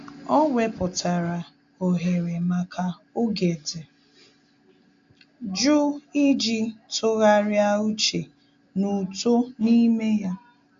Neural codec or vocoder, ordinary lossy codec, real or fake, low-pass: none; AAC, 64 kbps; real; 7.2 kHz